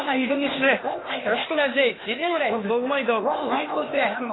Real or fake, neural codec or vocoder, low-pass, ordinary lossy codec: fake; codec, 16 kHz, 0.8 kbps, ZipCodec; 7.2 kHz; AAC, 16 kbps